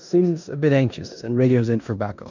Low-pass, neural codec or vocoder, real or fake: 7.2 kHz; codec, 16 kHz in and 24 kHz out, 0.9 kbps, LongCat-Audio-Codec, four codebook decoder; fake